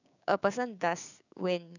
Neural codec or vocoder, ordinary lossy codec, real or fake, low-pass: codec, 16 kHz, 6 kbps, DAC; none; fake; 7.2 kHz